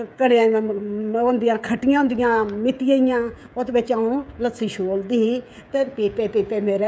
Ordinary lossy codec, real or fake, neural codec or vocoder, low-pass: none; fake; codec, 16 kHz, 16 kbps, FreqCodec, smaller model; none